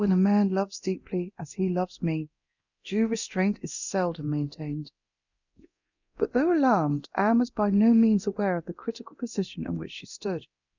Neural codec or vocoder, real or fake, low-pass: codec, 24 kHz, 0.9 kbps, DualCodec; fake; 7.2 kHz